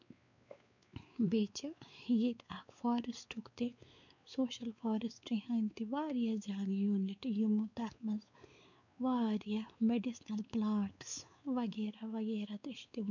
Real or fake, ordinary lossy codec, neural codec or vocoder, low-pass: fake; none; codec, 16 kHz, 4 kbps, X-Codec, WavLM features, trained on Multilingual LibriSpeech; 7.2 kHz